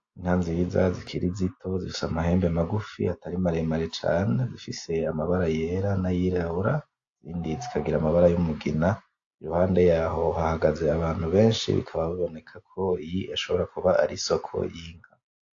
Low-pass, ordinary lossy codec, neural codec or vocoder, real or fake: 7.2 kHz; AAC, 48 kbps; none; real